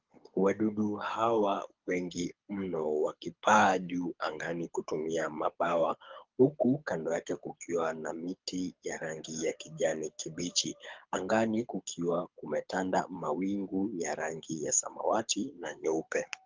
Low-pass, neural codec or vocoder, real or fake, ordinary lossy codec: 7.2 kHz; codec, 24 kHz, 6 kbps, HILCodec; fake; Opus, 32 kbps